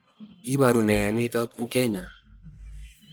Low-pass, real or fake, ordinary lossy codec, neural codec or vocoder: none; fake; none; codec, 44.1 kHz, 1.7 kbps, Pupu-Codec